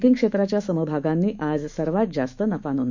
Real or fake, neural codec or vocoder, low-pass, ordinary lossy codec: fake; autoencoder, 48 kHz, 128 numbers a frame, DAC-VAE, trained on Japanese speech; 7.2 kHz; none